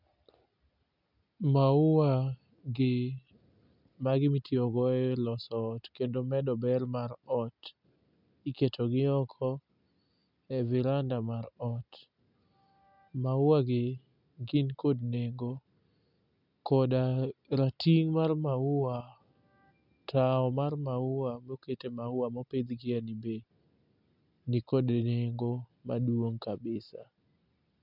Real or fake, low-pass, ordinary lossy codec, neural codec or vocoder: real; 5.4 kHz; none; none